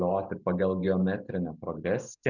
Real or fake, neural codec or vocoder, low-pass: real; none; 7.2 kHz